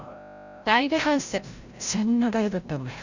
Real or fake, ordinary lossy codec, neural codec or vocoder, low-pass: fake; Opus, 64 kbps; codec, 16 kHz, 0.5 kbps, FreqCodec, larger model; 7.2 kHz